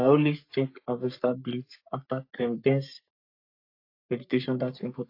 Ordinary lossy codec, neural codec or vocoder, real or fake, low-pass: AAC, 32 kbps; codec, 44.1 kHz, 3.4 kbps, Pupu-Codec; fake; 5.4 kHz